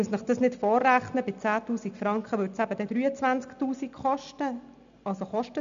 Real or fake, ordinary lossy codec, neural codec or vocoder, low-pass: real; MP3, 64 kbps; none; 7.2 kHz